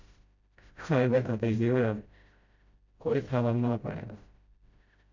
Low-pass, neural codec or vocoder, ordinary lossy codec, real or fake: 7.2 kHz; codec, 16 kHz, 0.5 kbps, FreqCodec, smaller model; MP3, 48 kbps; fake